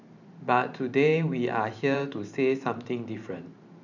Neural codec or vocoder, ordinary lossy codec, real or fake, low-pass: vocoder, 44.1 kHz, 128 mel bands every 512 samples, BigVGAN v2; none; fake; 7.2 kHz